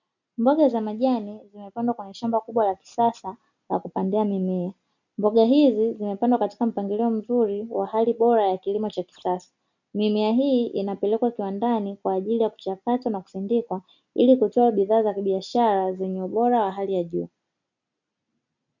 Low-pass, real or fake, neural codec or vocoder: 7.2 kHz; real; none